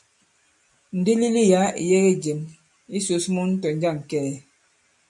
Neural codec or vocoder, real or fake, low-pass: none; real; 10.8 kHz